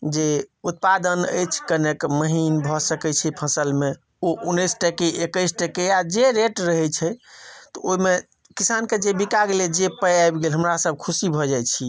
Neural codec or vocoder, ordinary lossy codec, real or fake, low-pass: none; none; real; none